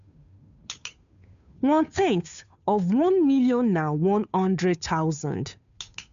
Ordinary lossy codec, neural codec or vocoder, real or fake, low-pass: MP3, 96 kbps; codec, 16 kHz, 2 kbps, FunCodec, trained on Chinese and English, 25 frames a second; fake; 7.2 kHz